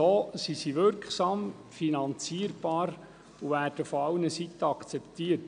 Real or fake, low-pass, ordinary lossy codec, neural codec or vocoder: real; 9.9 kHz; none; none